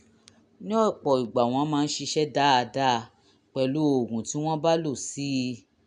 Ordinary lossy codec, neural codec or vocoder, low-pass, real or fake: none; none; 9.9 kHz; real